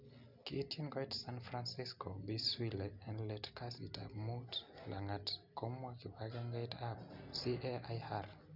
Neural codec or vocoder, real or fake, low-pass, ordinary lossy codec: none; real; 5.4 kHz; none